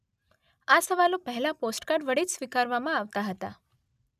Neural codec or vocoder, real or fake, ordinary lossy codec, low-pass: none; real; none; 14.4 kHz